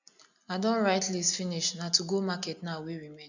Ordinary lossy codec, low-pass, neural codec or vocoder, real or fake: none; 7.2 kHz; none; real